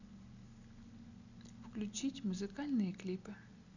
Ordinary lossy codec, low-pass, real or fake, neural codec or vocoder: none; 7.2 kHz; real; none